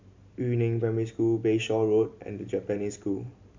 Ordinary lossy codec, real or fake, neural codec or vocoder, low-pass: MP3, 64 kbps; real; none; 7.2 kHz